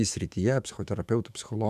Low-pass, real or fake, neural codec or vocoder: 14.4 kHz; fake; autoencoder, 48 kHz, 128 numbers a frame, DAC-VAE, trained on Japanese speech